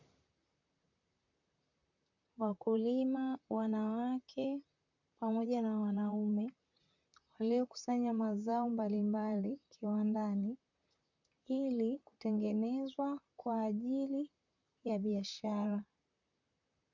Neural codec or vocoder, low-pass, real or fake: vocoder, 44.1 kHz, 128 mel bands, Pupu-Vocoder; 7.2 kHz; fake